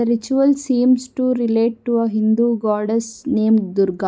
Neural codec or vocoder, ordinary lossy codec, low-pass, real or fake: none; none; none; real